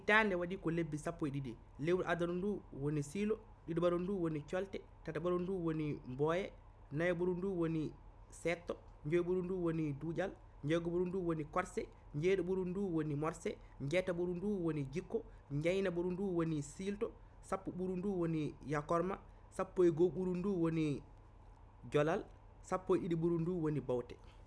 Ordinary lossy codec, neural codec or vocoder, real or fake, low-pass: none; none; real; none